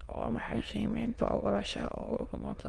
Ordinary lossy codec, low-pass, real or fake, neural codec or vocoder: Opus, 24 kbps; 9.9 kHz; fake; autoencoder, 22.05 kHz, a latent of 192 numbers a frame, VITS, trained on many speakers